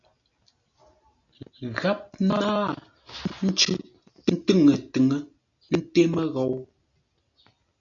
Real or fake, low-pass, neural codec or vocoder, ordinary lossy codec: real; 7.2 kHz; none; MP3, 96 kbps